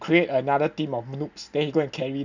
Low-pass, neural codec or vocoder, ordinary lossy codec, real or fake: 7.2 kHz; none; none; real